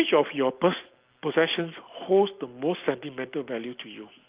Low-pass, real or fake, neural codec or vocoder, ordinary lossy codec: 3.6 kHz; real; none; Opus, 32 kbps